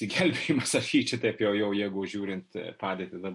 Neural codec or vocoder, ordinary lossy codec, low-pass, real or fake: none; MP3, 48 kbps; 10.8 kHz; real